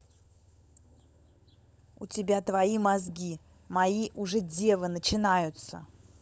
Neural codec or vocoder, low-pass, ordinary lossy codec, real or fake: codec, 16 kHz, 16 kbps, FunCodec, trained on LibriTTS, 50 frames a second; none; none; fake